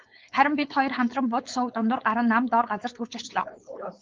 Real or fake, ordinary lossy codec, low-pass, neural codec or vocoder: fake; Opus, 32 kbps; 7.2 kHz; codec, 16 kHz, 4.8 kbps, FACodec